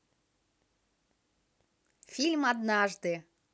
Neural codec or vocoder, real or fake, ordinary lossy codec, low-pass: none; real; none; none